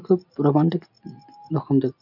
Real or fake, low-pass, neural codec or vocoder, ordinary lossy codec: real; 5.4 kHz; none; none